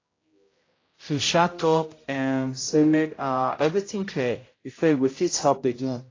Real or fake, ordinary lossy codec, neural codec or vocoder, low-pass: fake; AAC, 32 kbps; codec, 16 kHz, 0.5 kbps, X-Codec, HuBERT features, trained on general audio; 7.2 kHz